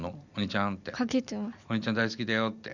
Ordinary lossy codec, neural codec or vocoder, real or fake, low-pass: none; none; real; 7.2 kHz